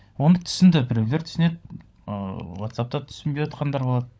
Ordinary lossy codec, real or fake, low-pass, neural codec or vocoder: none; fake; none; codec, 16 kHz, 16 kbps, FunCodec, trained on LibriTTS, 50 frames a second